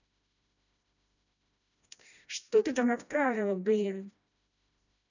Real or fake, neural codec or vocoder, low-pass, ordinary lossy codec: fake; codec, 16 kHz, 1 kbps, FreqCodec, smaller model; 7.2 kHz; none